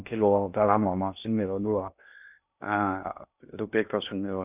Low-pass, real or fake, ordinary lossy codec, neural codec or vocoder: 3.6 kHz; fake; none; codec, 16 kHz in and 24 kHz out, 0.6 kbps, FocalCodec, streaming, 2048 codes